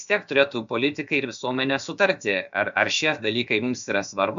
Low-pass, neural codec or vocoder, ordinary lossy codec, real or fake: 7.2 kHz; codec, 16 kHz, about 1 kbps, DyCAST, with the encoder's durations; MP3, 64 kbps; fake